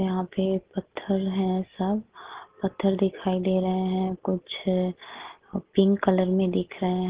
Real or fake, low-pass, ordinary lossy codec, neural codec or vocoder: real; 3.6 kHz; Opus, 16 kbps; none